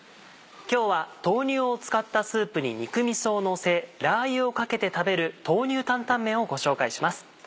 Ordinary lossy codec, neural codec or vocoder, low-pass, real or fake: none; none; none; real